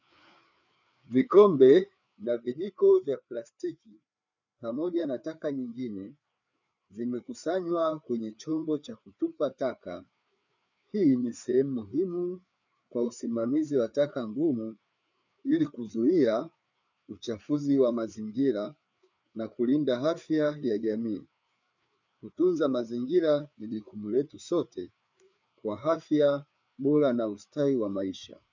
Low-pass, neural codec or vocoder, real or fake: 7.2 kHz; codec, 16 kHz, 4 kbps, FreqCodec, larger model; fake